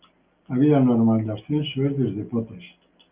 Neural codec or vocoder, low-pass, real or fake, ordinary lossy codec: none; 3.6 kHz; real; Opus, 24 kbps